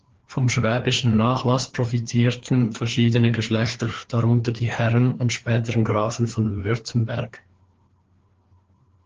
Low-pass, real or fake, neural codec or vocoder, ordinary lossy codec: 7.2 kHz; fake; codec, 16 kHz, 2 kbps, FreqCodec, larger model; Opus, 16 kbps